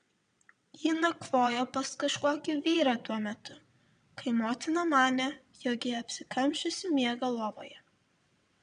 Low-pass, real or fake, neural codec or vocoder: 9.9 kHz; fake; vocoder, 22.05 kHz, 80 mel bands, WaveNeXt